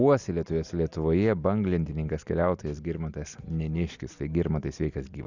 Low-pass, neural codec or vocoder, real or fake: 7.2 kHz; none; real